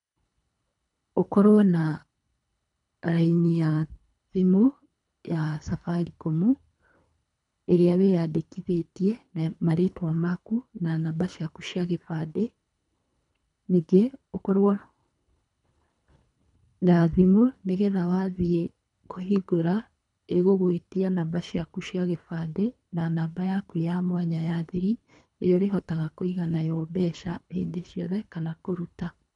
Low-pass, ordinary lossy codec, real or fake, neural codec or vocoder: 10.8 kHz; none; fake; codec, 24 kHz, 3 kbps, HILCodec